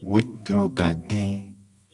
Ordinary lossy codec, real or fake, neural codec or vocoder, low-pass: Opus, 64 kbps; fake; codec, 24 kHz, 0.9 kbps, WavTokenizer, medium music audio release; 10.8 kHz